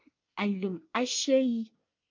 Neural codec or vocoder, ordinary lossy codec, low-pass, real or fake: codec, 24 kHz, 1 kbps, SNAC; MP3, 64 kbps; 7.2 kHz; fake